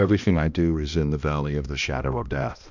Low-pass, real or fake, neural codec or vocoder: 7.2 kHz; fake; codec, 16 kHz, 1 kbps, X-Codec, HuBERT features, trained on balanced general audio